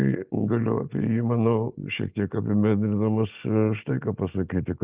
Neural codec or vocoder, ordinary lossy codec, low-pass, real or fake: none; Opus, 32 kbps; 3.6 kHz; real